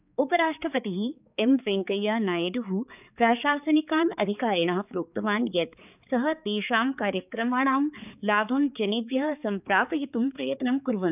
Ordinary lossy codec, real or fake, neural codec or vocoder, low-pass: none; fake; codec, 16 kHz, 4 kbps, X-Codec, HuBERT features, trained on balanced general audio; 3.6 kHz